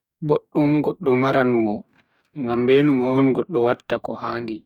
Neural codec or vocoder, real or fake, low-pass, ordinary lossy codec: codec, 44.1 kHz, 2.6 kbps, DAC; fake; 19.8 kHz; none